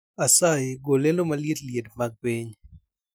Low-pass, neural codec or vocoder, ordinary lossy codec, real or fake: none; none; none; real